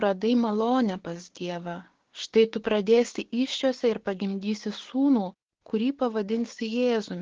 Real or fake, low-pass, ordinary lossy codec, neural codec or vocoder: fake; 7.2 kHz; Opus, 16 kbps; codec, 16 kHz, 6 kbps, DAC